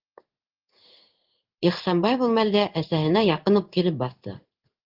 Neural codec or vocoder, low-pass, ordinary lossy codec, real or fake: codec, 16 kHz in and 24 kHz out, 1 kbps, XY-Tokenizer; 5.4 kHz; Opus, 24 kbps; fake